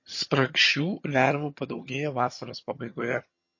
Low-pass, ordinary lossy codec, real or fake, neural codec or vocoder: 7.2 kHz; MP3, 32 kbps; fake; vocoder, 22.05 kHz, 80 mel bands, HiFi-GAN